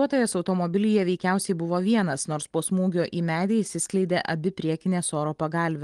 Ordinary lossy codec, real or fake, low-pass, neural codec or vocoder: Opus, 24 kbps; real; 10.8 kHz; none